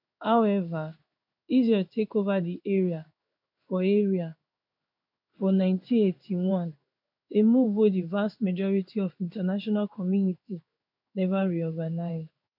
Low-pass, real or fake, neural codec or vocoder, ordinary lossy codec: 5.4 kHz; fake; codec, 16 kHz in and 24 kHz out, 1 kbps, XY-Tokenizer; none